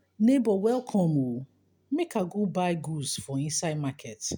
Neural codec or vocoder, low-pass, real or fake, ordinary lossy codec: none; none; real; none